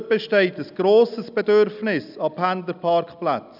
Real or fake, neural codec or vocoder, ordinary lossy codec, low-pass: real; none; none; 5.4 kHz